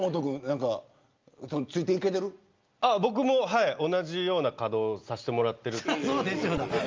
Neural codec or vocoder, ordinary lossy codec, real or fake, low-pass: none; Opus, 24 kbps; real; 7.2 kHz